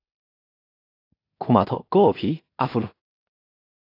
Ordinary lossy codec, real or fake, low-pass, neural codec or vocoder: AAC, 32 kbps; fake; 5.4 kHz; codec, 16 kHz in and 24 kHz out, 0.4 kbps, LongCat-Audio-Codec, two codebook decoder